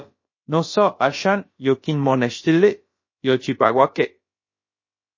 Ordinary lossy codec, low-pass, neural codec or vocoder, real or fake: MP3, 32 kbps; 7.2 kHz; codec, 16 kHz, about 1 kbps, DyCAST, with the encoder's durations; fake